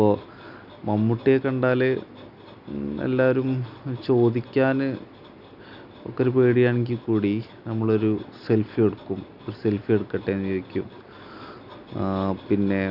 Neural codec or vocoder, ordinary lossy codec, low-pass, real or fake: none; none; 5.4 kHz; real